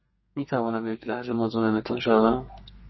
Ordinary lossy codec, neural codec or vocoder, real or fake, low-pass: MP3, 24 kbps; codec, 32 kHz, 1.9 kbps, SNAC; fake; 7.2 kHz